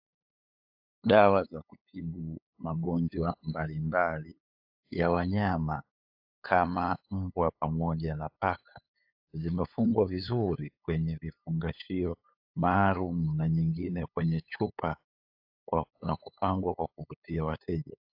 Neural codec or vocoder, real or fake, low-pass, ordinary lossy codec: codec, 16 kHz, 8 kbps, FunCodec, trained on LibriTTS, 25 frames a second; fake; 5.4 kHz; AAC, 48 kbps